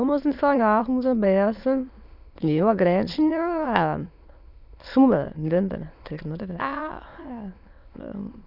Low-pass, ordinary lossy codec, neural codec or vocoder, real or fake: 5.4 kHz; none; autoencoder, 22.05 kHz, a latent of 192 numbers a frame, VITS, trained on many speakers; fake